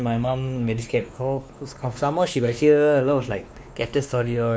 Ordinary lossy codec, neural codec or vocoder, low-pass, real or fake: none; codec, 16 kHz, 2 kbps, X-Codec, WavLM features, trained on Multilingual LibriSpeech; none; fake